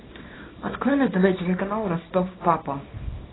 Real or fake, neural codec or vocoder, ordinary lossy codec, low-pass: fake; codec, 24 kHz, 0.9 kbps, WavTokenizer, small release; AAC, 16 kbps; 7.2 kHz